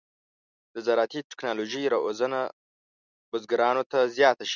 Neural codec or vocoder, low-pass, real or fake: none; 7.2 kHz; real